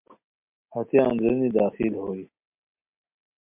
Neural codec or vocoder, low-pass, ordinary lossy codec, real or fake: none; 3.6 kHz; MP3, 32 kbps; real